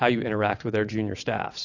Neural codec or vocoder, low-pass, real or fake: vocoder, 22.05 kHz, 80 mel bands, WaveNeXt; 7.2 kHz; fake